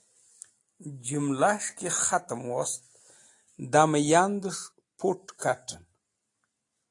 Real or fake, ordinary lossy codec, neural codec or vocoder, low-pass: real; AAC, 48 kbps; none; 10.8 kHz